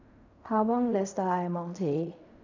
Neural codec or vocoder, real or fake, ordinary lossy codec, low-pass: codec, 16 kHz in and 24 kHz out, 0.4 kbps, LongCat-Audio-Codec, fine tuned four codebook decoder; fake; none; 7.2 kHz